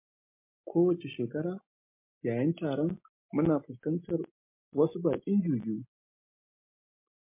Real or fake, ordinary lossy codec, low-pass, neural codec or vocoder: real; MP3, 24 kbps; 3.6 kHz; none